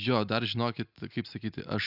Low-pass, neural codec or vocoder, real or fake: 5.4 kHz; none; real